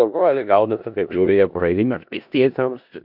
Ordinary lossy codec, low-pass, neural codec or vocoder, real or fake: AAC, 48 kbps; 5.4 kHz; codec, 16 kHz in and 24 kHz out, 0.4 kbps, LongCat-Audio-Codec, four codebook decoder; fake